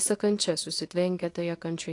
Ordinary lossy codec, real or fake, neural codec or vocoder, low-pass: AAC, 48 kbps; fake; codec, 24 kHz, 0.9 kbps, WavTokenizer, small release; 10.8 kHz